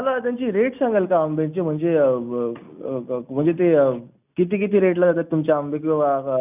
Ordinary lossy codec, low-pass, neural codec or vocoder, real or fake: none; 3.6 kHz; none; real